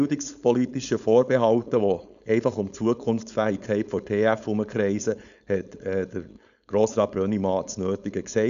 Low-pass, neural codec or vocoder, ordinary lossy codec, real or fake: 7.2 kHz; codec, 16 kHz, 4.8 kbps, FACodec; none; fake